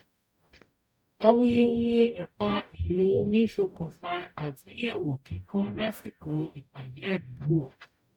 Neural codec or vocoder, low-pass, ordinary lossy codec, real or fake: codec, 44.1 kHz, 0.9 kbps, DAC; 19.8 kHz; none; fake